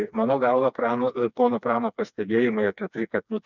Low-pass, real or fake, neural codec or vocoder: 7.2 kHz; fake; codec, 16 kHz, 2 kbps, FreqCodec, smaller model